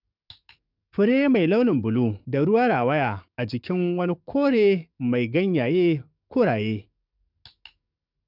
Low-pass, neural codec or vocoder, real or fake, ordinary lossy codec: 5.4 kHz; codec, 44.1 kHz, 7.8 kbps, DAC; fake; none